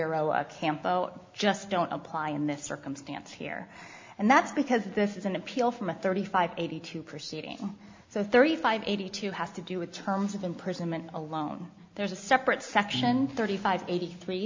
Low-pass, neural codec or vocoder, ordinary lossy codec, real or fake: 7.2 kHz; none; MP3, 64 kbps; real